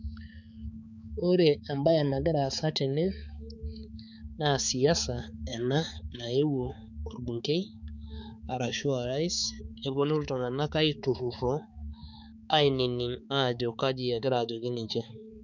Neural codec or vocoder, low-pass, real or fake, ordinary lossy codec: codec, 16 kHz, 4 kbps, X-Codec, HuBERT features, trained on balanced general audio; 7.2 kHz; fake; none